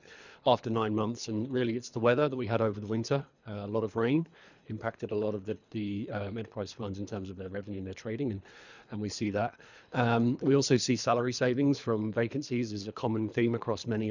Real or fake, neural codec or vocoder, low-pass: fake; codec, 24 kHz, 3 kbps, HILCodec; 7.2 kHz